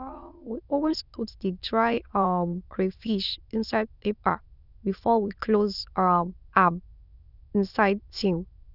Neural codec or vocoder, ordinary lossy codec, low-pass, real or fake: autoencoder, 22.05 kHz, a latent of 192 numbers a frame, VITS, trained on many speakers; none; 5.4 kHz; fake